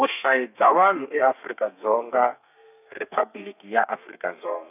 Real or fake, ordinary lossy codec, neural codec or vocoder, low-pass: fake; none; codec, 32 kHz, 1.9 kbps, SNAC; 3.6 kHz